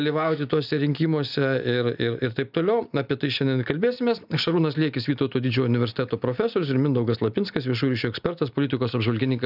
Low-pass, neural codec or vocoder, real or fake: 5.4 kHz; none; real